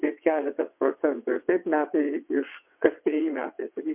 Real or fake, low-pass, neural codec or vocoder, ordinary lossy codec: fake; 3.6 kHz; vocoder, 22.05 kHz, 80 mel bands, WaveNeXt; MP3, 32 kbps